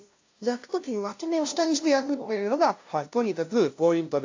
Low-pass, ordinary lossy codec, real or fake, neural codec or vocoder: 7.2 kHz; AAC, 48 kbps; fake; codec, 16 kHz, 0.5 kbps, FunCodec, trained on LibriTTS, 25 frames a second